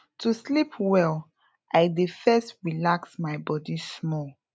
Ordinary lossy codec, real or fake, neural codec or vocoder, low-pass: none; real; none; none